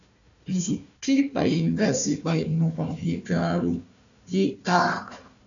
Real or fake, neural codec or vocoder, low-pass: fake; codec, 16 kHz, 1 kbps, FunCodec, trained on Chinese and English, 50 frames a second; 7.2 kHz